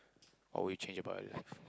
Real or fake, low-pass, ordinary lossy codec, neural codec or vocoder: real; none; none; none